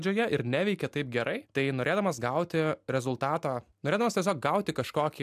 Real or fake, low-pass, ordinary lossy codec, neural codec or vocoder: real; 14.4 kHz; MP3, 96 kbps; none